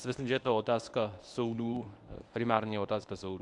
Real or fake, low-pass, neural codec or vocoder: fake; 10.8 kHz; codec, 24 kHz, 0.9 kbps, WavTokenizer, medium speech release version 1